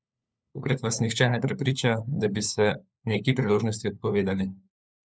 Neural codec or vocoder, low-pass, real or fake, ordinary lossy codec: codec, 16 kHz, 4 kbps, FunCodec, trained on LibriTTS, 50 frames a second; none; fake; none